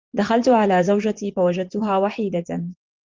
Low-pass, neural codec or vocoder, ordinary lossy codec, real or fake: 7.2 kHz; vocoder, 24 kHz, 100 mel bands, Vocos; Opus, 32 kbps; fake